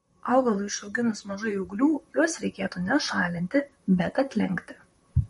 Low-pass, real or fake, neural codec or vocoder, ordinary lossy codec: 19.8 kHz; fake; vocoder, 44.1 kHz, 128 mel bands, Pupu-Vocoder; MP3, 48 kbps